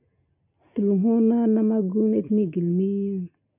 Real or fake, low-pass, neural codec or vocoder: real; 3.6 kHz; none